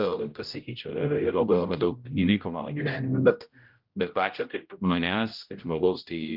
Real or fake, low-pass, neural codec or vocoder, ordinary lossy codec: fake; 5.4 kHz; codec, 16 kHz, 0.5 kbps, X-Codec, HuBERT features, trained on general audio; Opus, 24 kbps